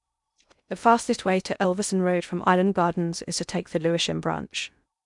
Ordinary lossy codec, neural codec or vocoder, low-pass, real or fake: none; codec, 16 kHz in and 24 kHz out, 0.6 kbps, FocalCodec, streaming, 2048 codes; 10.8 kHz; fake